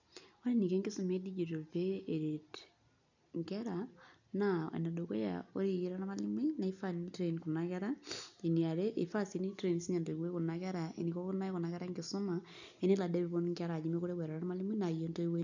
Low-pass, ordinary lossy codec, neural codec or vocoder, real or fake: 7.2 kHz; none; none; real